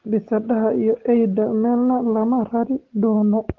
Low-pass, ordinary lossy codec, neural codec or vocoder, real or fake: 7.2 kHz; Opus, 16 kbps; none; real